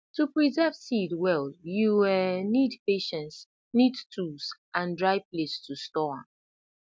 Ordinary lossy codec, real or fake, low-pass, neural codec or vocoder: none; real; none; none